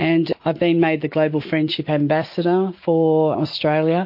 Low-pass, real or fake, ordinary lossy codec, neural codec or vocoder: 5.4 kHz; real; MP3, 32 kbps; none